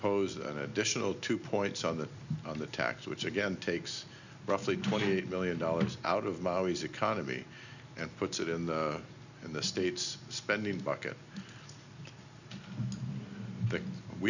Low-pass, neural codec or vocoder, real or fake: 7.2 kHz; none; real